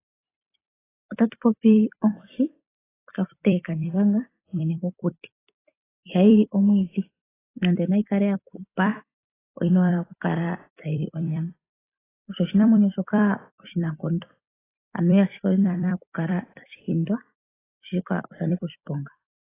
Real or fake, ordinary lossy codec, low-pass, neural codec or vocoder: fake; AAC, 16 kbps; 3.6 kHz; vocoder, 44.1 kHz, 128 mel bands every 256 samples, BigVGAN v2